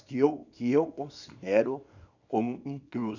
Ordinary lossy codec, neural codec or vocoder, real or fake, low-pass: none; codec, 24 kHz, 0.9 kbps, WavTokenizer, small release; fake; 7.2 kHz